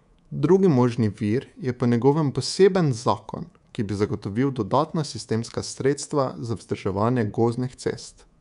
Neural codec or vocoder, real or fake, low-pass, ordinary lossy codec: codec, 24 kHz, 3.1 kbps, DualCodec; fake; 10.8 kHz; none